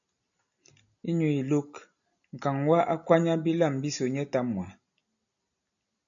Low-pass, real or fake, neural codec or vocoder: 7.2 kHz; real; none